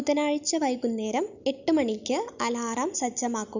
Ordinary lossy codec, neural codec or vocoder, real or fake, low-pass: none; none; real; 7.2 kHz